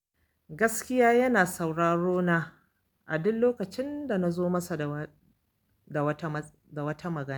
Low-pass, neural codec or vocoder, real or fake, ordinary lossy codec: none; none; real; none